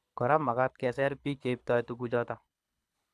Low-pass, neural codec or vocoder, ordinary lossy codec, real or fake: none; codec, 24 kHz, 6 kbps, HILCodec; none; fake